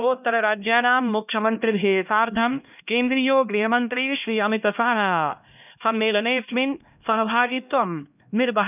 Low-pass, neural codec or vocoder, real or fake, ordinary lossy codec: 3.6 kHz; codec, 16 kHz, 1 kbps, X-Codec, HuBERT features, trained on LibriSpeech; fake; none